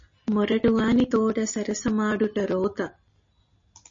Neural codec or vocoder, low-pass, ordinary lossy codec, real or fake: none; 7.2 kHz; MP3, 32 kbps; real